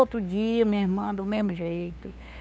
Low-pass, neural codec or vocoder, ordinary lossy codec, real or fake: none; codec, 16 kHz, 2 kbps, FunCodec, trained on LibriTTS, 25 frames a second; none; fake